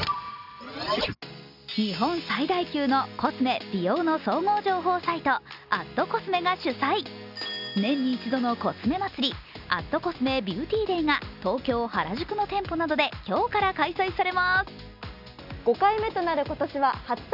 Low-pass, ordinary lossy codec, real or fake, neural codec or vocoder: 5.4 kHz; none; real; none